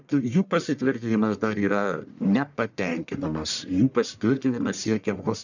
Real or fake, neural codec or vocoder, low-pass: fake; codec, 44.1 kHz, 1.7 kbps, Pupu-Codec; 7.2 kHz